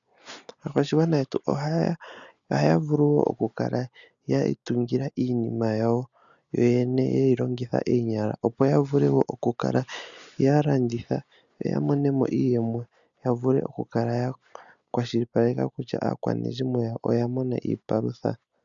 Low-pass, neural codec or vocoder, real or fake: 7.2 kHz; none; real